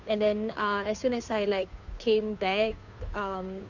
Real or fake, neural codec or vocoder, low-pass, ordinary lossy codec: fake; codec, 16 kHz, 2 kbps, FunCodec, trained on Chinese and English, 25 frames a second; 7.2 kHz; none